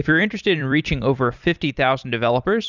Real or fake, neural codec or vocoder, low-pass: fake; vocoder, 44.1 kHz, 128 mel bands every 256 samples, BigVGAN v2; 7.2 kHz